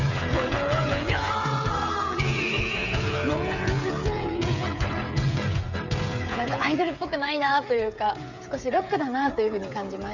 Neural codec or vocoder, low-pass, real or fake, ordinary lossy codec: codec, 16 kHz, 8 kbps, FreqCodec, smaller model; 7.2 kHz; fake; none